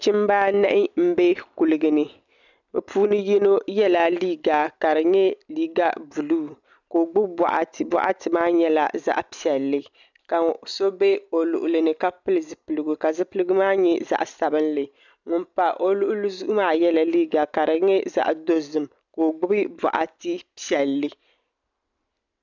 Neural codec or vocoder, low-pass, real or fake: none; 7.2 kHz; real